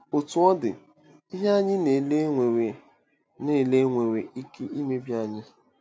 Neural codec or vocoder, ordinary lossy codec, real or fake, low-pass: none; none; real; none